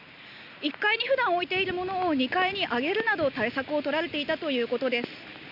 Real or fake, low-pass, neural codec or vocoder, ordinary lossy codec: real; 5.4 kHz; none; none